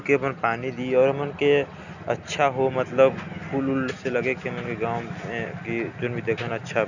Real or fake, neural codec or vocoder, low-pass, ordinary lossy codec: real; none; 7.2 kHz; none